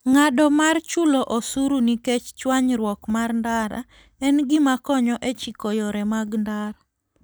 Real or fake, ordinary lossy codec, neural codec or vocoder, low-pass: real; none; none; none